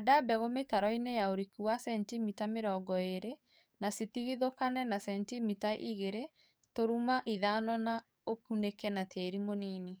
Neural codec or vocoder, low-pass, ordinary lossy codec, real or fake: codec, 44.1 kHz, 7.8 kbps, DAC; none; none; fake